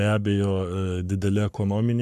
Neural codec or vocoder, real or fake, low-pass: codec, 44.1 kHz, 7.8 kbps, Pupu-Codec; fake; 14.4 kHz